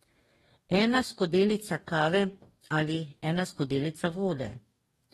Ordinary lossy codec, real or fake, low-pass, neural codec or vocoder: AAC, 32 kbps; fake; 19.8 kHz; codec, 44.1 kHz, 2.6 kbps, DAC